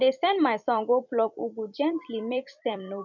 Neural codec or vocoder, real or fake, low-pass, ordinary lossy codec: vocoder, 44.1 kHz, 128 mel bands every 256 samples, BigVGAN v2; fake; 7.2 kHz; none